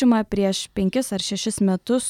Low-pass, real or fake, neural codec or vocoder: 19.8 kHz; real; none